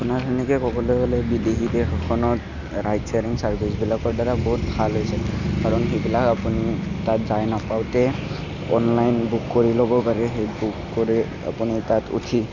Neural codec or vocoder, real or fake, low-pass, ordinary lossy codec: none; real; 7.2 kHz; none